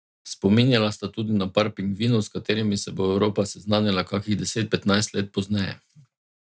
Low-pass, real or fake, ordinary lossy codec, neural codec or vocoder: none; real; none; none